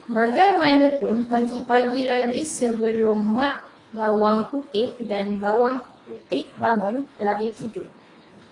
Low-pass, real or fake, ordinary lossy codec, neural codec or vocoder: 10.8 kHz; fake; AAC, 32 kbps; codec, 24 kHz, 1.5 kbps, HILCodec